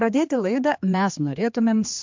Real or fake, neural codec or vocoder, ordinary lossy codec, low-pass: fake; codec, 16 kHz, 2 kbps, X-Codec, HuBERT features, trained on general audio; MP3, 64 kbps; 7.2 kHz